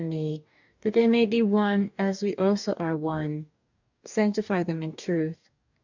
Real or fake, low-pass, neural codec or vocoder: fake; 7.2 kHz; codec, 44.1 kHz, 2.6 kbps, DAC